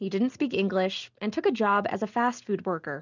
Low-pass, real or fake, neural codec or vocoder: 7.2 kHz; real; none